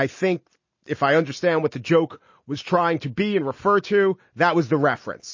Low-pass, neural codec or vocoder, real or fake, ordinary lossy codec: 7.2 kHz; none; real; MP3, 32 kbps